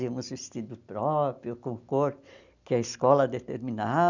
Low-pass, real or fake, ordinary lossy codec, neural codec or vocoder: 7.2 kHz; real; none; none